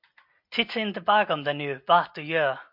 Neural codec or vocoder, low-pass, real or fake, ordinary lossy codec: none; 5.4 kHz; real; MP3, 48 kbps